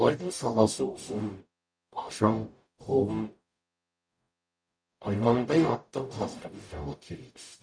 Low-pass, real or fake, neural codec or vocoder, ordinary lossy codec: 9.9 kHz; fake; codec, 44.1 kHz, 0.9 kbps, DAC; none